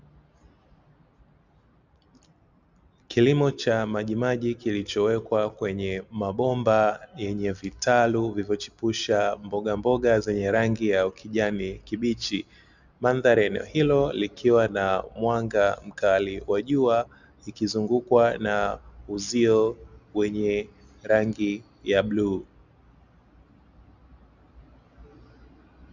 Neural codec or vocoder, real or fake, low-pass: none; real; 7.2 kHz